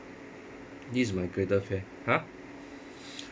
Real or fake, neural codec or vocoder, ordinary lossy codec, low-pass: real; none; none; none